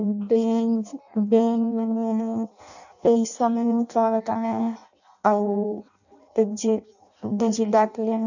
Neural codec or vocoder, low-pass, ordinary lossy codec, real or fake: codec, 16 kHz in and 24 kHz out, 0.6 kbps, FireRedTTS-2 codec; 7.2 kHz; none; fake